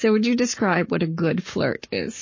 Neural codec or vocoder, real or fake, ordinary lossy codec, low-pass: codec, 44.1 kHz, 7.8 kbps, Pupu-Codec; fake; MP3, 32 kbps; 7.2 kHz